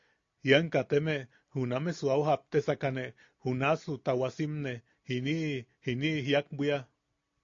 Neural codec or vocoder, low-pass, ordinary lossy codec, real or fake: none; 7.2 kHz; AAC, 48 kbps; real